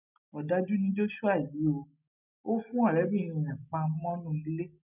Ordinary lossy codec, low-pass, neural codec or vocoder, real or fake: none; 3.6 kHz; none; real